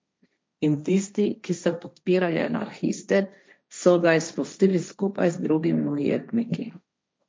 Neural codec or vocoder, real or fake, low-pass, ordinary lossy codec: codec, 16 kHz, 1.1 kbps, Voila-Tokenizer; fake; none; none